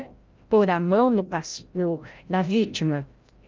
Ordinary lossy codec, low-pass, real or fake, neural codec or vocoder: Opus, 32 kbps; 7.2 kHz; fake; codec, 16 kHz, 0.5 kbps, FreqCodec, larger model